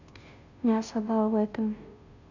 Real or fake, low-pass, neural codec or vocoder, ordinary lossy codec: fake; 7.2 kHz; codec, 16 kHz, 0.5 kbps, FunCodec, trained on Chinese and English, 25 frames a second; none